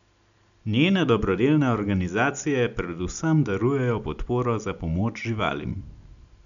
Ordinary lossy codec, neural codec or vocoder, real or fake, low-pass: none; none; real; 7.2 kHz